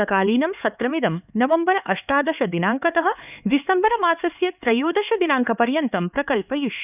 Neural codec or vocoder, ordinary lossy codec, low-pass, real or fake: codec, 16 kHz, 4 kbps, X-Codec, HuBERT features, trained on LibriSpeech; none; 3.6 kHz; fake